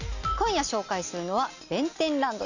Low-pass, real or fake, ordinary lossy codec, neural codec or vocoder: 7.2 kHz; real; none; none